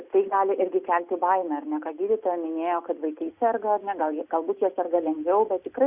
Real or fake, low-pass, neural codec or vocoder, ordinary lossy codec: real; 3.6 kHz; none; Opus, 64 kbps